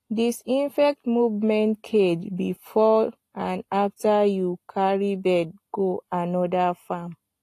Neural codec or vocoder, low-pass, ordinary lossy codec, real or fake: none; 14.4 kHz; AAC, 48 kbps; real